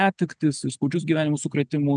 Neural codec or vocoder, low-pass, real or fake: none; 9.9 kHz; real